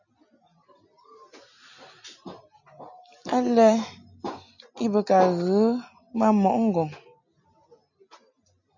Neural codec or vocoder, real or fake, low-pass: none; real; 7.2 kHz